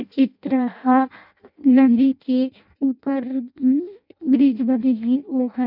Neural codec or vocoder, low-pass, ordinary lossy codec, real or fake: codec, 16 kHz in and 24 kHz out, 0.6 kbps, FireRedTTS-2 codec; 5.4 kHz; none; fake